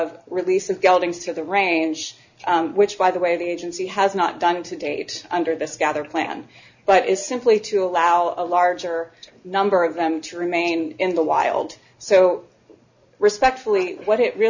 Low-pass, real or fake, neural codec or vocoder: 7.2 kHz; real; none